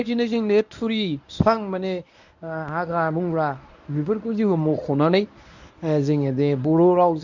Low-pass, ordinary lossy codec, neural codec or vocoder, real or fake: 7.2 kHz; none; codec, 24 kHz, 0.9 kbps, WavTokenizer, medium speech release version 1; fake